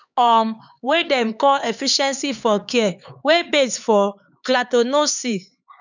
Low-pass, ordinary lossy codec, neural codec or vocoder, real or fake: 7.2 kHz; none; codec, 16 kHz, 4 kbps, X-Codec, HuBERT features, trained on LibriSpeech; fake